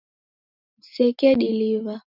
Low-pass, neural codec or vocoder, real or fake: 5.4 kHz; none; real